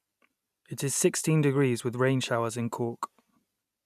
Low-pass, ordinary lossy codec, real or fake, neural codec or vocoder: 14.4 kHz; none; real; none